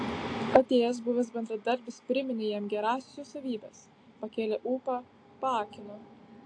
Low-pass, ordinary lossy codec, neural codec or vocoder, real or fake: 9.9 kHz; AAC, 48 kbps; none; real